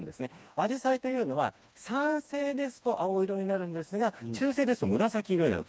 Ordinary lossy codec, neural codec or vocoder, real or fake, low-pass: none; codec, 16 kHz, 2 kbps, FreqCodec, smaller model; fake; none